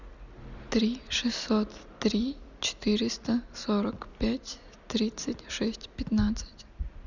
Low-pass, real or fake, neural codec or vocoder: 7.2 kHz; real; none